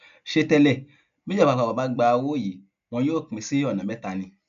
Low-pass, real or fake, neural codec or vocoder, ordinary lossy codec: 7.2 kHz; real; none; none